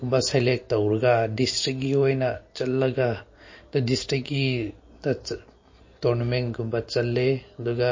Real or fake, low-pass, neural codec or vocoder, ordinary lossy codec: real; 7.2 kHz; none; MP3, 32 kbps